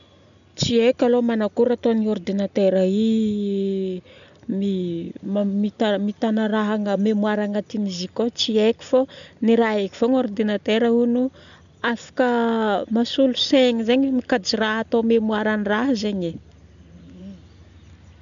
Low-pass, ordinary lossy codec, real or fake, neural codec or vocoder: 7.2 kHz; none; real; none